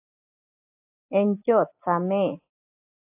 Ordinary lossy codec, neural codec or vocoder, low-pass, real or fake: AAC, 32 kbps; none; 3.6 kHz; real